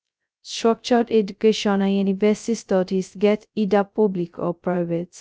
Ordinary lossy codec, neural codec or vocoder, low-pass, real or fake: none; codec, 16 kHz, 0.2 kbps, FocalCodec; none; fake